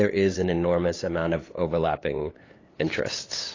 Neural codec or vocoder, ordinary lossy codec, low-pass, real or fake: codec, 16 kHz, 8 kbps, FunCodec, trained on LibriTTS, 25 frames a second; AAC, 32 kbps; 7.2 kHz; fake